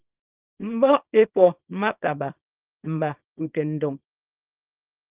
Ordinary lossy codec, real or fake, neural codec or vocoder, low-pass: Opus, 24 kbps; fake; codec, 24 kHz, 0.9 kbps, WavTokenizer, small release; 3.6 kHz